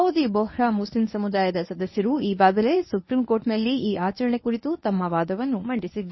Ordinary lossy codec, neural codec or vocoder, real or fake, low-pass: MP3, 24 kbps; codec, 24 kHz, 0.9 kbps, WavTokenizer, medium speech release version 1; fake; 7.2 kHz